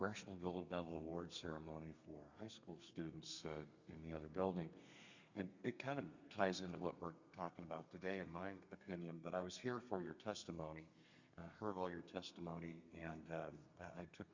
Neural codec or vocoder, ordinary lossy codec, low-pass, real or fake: codec, 32 kHz, 1.9 kbps, SNAC; Opus, 64 kbps; 7.2 kHz; fake